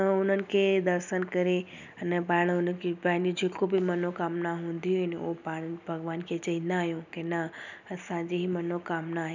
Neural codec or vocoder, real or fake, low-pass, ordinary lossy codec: none; real; 7.2 kHz; none